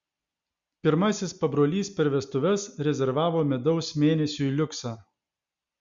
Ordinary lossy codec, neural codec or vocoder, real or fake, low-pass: Opus, 64 kbps; none; real; 7.2 kHz